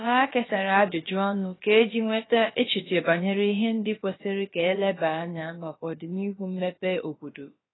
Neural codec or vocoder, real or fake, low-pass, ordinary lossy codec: codec, 16 kHz, about 1 kbps, DyCAST, with the encoder's durations; fake; 7.2 kHz; AAC, 16 kbps